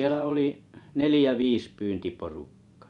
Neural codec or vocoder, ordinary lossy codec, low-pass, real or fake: vocoder, 24 kHz, 100 mel bands, Vocos; none; 10.8 kHz; fake